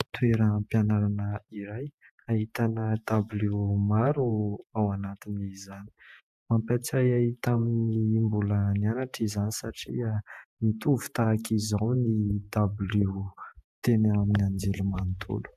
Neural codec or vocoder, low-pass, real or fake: vocoder, 48 kHz, 128 mel bands, Vocos; 14.4 kHz; fake